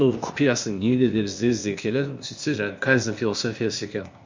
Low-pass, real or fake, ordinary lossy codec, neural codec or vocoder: 7.2 kHz; fake; MP3, 64 kbps; codec, 16 kHz, 0.8 kbps, ZipCodec